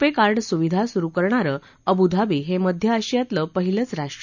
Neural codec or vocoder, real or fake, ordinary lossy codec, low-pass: none; real; none; 7.2 kHz